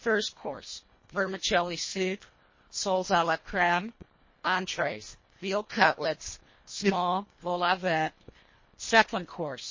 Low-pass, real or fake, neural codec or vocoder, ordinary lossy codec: 7.2 kHz; fake; codec, 24 kHz, 1.5 kbps, HILCodec; MP3, 32 kbps